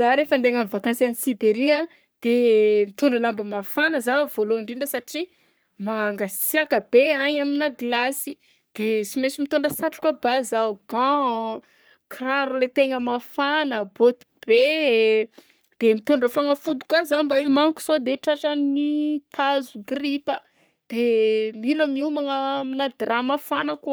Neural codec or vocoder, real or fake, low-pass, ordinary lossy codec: codec, 44.1 kHz, 3.4 kbps, Pupu-Codec; fake; none; none